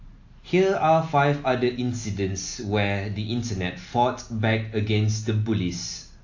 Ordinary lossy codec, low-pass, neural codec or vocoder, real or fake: AAC, 48 kbps; 7.2 kHz; none; real